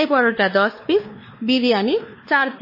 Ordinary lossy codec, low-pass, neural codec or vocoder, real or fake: MP3, 24 kbps; 5.4 kHz; codec, 16 kHz, 2 kbps, X-Codec, WavLM features, trained on Multilingual LibriSpeech; fake